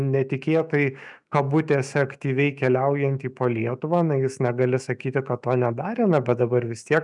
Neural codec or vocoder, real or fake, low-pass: autoencoder, 48 kHz, 128 numbers a frame, DAC-VAE, trained on Japanese speech; fake; 10.8 kHz